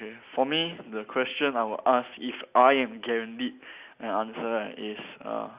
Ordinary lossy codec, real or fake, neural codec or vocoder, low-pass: Opus, 64 kbps; real; none; 3.6 kHz